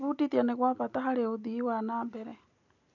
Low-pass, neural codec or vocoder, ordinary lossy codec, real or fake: 7.2 kHz; none; none; real